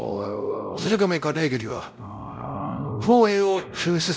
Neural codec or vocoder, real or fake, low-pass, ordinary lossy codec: codec, 16 kHz, 0.5 kbps, X-Codec, WavLM features, trained on Multilingual LibriSpeech; fake; none; none